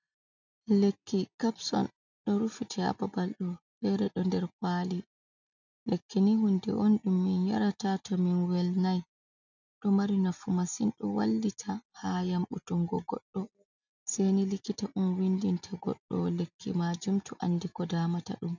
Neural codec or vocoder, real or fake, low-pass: none; real; 7.2 kHz